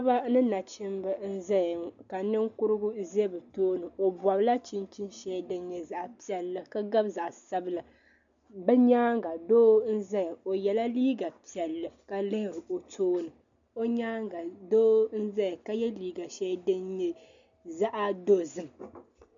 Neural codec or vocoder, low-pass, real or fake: none; 7.2 kHz; real